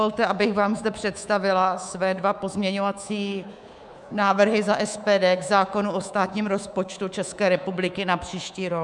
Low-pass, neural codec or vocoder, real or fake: 10.8 kHz; codec, 24 kHz, 3.1 kbps, DualCodec; fake